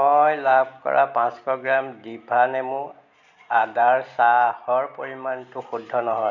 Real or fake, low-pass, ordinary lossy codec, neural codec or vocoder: real; 7.2 kHz; none; none